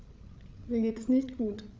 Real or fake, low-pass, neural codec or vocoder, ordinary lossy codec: fake; none; codec, 16 kHz, 8 kbps, FreqCodec, larger model; none